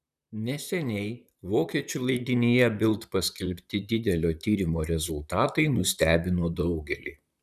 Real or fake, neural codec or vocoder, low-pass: fake; vocoder, 44.1 kHz, 128 mel bands, Pupu-Vocoder; 14.4 kHz